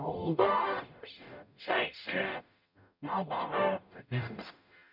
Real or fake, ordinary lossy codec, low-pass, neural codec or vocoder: fake; none; 5.4 kHz; codec, 44.1 kHz, 0.9 kbps, DAC